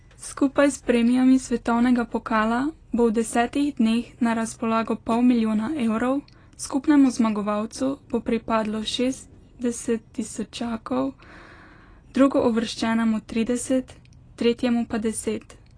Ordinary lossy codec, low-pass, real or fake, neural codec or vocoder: AAC, 32 kbps; 9.9 kHz; real; none